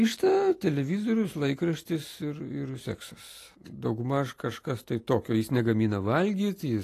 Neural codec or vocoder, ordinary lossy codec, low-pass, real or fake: none; AAC, 48 kbps; 14.4 kHz; real